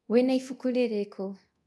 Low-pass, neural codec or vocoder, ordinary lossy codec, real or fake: none; codec, 24 kHz, 0.9 kbps, DualCodec; none; fake